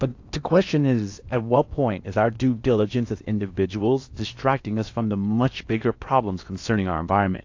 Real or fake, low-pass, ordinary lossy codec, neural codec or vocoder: fake; 7.2 kHz; AAC, 48 kbps; codec, 16 kHz in and 24 kHz out, 0.8 kbps, FocalCodec, streaming, 65536 codes